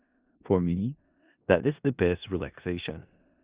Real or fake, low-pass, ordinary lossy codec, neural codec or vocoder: fake; 3.6 kHz; Opus, 64 kbps; codec, 16 kHz in and 24 kHz out, 0.4 kbps, LongCat-Audio-Codec, four codebook decoder